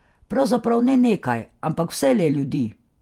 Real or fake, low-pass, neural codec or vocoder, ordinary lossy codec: fake; 19.8 kHz; vocoder, 48 kHz, 128 mel bands, Vocos; Opus, 32 kbps